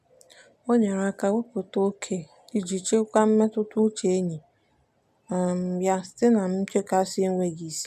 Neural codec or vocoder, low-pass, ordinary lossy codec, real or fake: none; 14.4 kHz; none; real